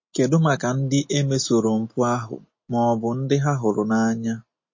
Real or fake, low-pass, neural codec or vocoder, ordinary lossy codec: real; 7.2 kHz; none; MP3, 32 kbps